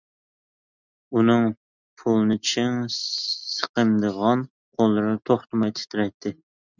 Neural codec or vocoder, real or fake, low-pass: none; real; 7.2 kHz